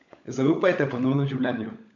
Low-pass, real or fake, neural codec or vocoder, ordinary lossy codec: 7.2 kHz; fake; codec, 16 kHz, 16 kbps, FunCodec, trained on LibriTTS, 50 frames a second; none